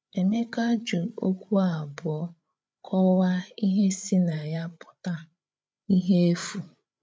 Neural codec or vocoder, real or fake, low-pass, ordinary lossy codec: codec, 16 kHz, 8 kbps, FreqCodec, larger model; fake; none; none